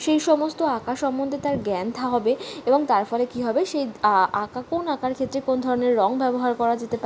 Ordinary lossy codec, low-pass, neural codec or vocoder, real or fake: none; none; none; real